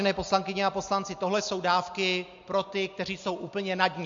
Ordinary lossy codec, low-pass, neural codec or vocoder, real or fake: MP3, 48 kbps; 7.2 kHz; none; real